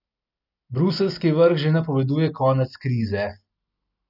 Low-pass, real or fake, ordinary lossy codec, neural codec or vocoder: 5.4 kHz; real; none; none